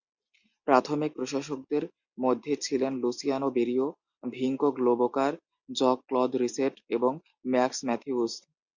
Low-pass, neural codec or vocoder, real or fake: 7.2 kHz; none; real